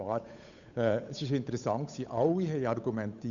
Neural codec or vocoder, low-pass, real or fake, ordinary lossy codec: codec, 16 kHz, 8 kbps, FunCodec, trained on Chinese and English, 25 frames a second; 7.2 kHz; fake; none